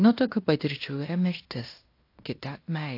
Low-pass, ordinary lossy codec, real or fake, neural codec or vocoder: 5.4 kHz; AAC, 32 kbps; fake; codec, 16 kHz in and 24 kHz out, 0.9 kbps, LongCat-Audio-Codec, fine tuned four codebook decoder